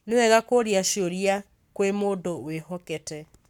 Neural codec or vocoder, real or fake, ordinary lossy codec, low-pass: codec, 44.1 kHz, 7.8 kbps, Pupu-Codec; fake; none; 19.8 kHz